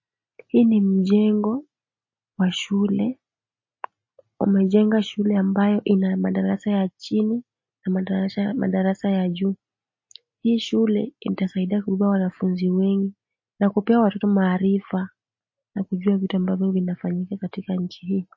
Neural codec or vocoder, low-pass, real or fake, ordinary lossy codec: none; 7.2 kHz; real; MP3, 32 kbps